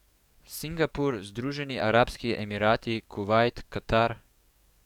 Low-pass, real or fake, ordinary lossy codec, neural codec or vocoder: 19.8 kHz; fake; none; codec, 44.1 kHz, 7.8 kbps, DAC